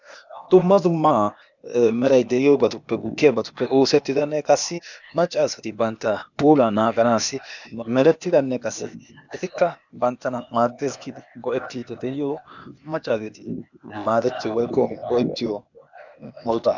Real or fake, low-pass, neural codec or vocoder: fake; 7.2 kHz; codec, 16 kHz, 0.8 kbps, ZipCodec